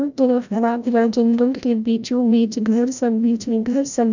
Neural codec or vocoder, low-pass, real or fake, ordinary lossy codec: codec, 16 kHz, 0.5 kbps, FreqCodec, larger model; 7.2 kHz; fake; none